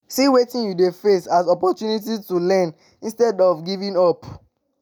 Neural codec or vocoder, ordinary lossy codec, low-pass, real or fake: none; none; none; real